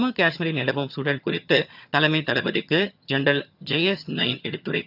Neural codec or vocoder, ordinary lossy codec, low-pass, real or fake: vocoder, 22.05 kHz, 80 mel bands, HiFi-GAN; none; 5.4 kHz; fake